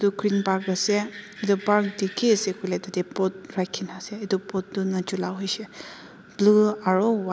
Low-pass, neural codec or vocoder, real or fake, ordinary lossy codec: none; none; real; none